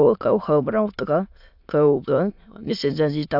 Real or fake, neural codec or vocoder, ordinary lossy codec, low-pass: fake; autoencoder, 22.05 kHz, a latent of 192 numbers a frame, VITS, trained on many speakers; AAC, 48 kbps; 5.4 kHz